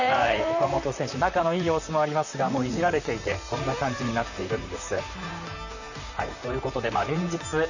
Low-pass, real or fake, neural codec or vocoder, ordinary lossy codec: 7.2 kHz; fake; vocoder, 44.1 kHz, 128 mel bands, Pupu-Vocoder; none